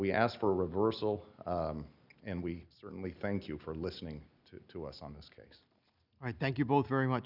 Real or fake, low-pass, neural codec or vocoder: real; 5.4 kHz; none